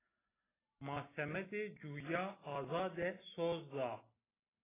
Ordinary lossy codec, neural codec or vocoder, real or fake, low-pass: AAC, 16 kbps; none; real; 3.6 kHz